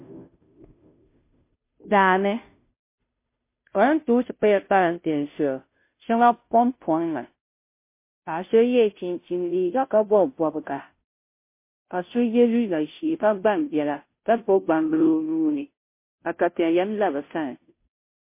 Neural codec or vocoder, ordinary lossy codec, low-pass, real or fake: codec, 16 kHz, 0.5 kbps, FunCodec, trained on Chinese and English, 25 frames a second; MP3, 24 kbps; 3.6 kHz; fake